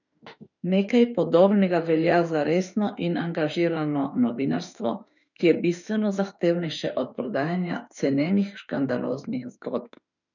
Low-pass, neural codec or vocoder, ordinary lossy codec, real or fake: 7.2 kHz; autoencoder, 48 kHz, 32 numbers a frame, DAC-VAE, trained on Japanese speech; none; fake